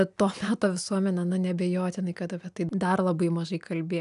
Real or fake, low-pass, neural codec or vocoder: real; 10.8 kHz; none